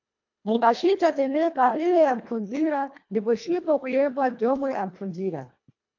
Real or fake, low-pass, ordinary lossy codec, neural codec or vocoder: fake; 7.2 kHz; MP3, 48 kbps; codec, 24 kHz, 1.5 kbps, HILCodec